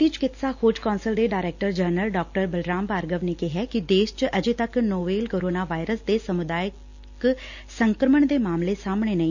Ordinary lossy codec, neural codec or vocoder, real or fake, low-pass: none; none; real; 7.2 kHz